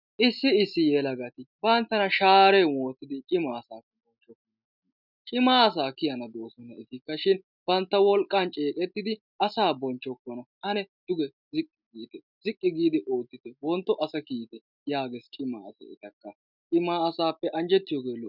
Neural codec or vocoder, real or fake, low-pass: none; real; 5.4 kHz